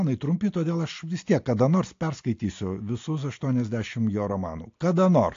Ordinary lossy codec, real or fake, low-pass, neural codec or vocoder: AAC, 48 kbps; real; 7.2 kHz; none